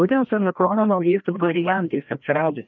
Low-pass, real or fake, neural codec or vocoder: 7.2 kHz; fake; codec, 16 kHz, 1 kbps, FreqCodec, larger model